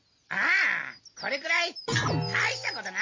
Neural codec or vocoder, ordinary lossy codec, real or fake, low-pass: none; MP3, 32 kbps; real; 7.2 kHz